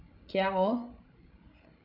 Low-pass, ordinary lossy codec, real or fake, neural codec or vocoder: 5.4 kHz; none; fake; codec, 16 kHz, 16 kbps, FreqCodec, larger model